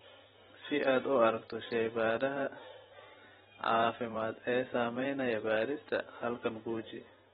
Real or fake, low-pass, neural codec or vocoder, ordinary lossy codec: fake; 19.8 kHz; vocoder, 44.1 kHz, 128 mel bands every 512 samples, BigVGAN v2; AAC, 16 kbps